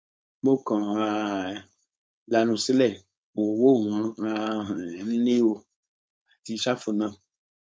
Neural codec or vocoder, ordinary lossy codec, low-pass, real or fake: codec, 16 kHz, 4.8 kbps, FACodec; none; none; fake